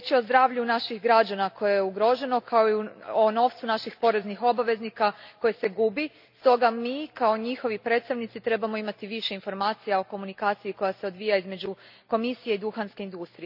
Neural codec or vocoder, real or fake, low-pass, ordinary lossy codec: none; real; 5.4 kHz; none